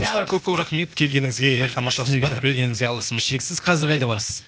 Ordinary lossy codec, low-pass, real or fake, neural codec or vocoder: none; none; fake; codec, 16 kHz, 0.8 kbps, ZipCodec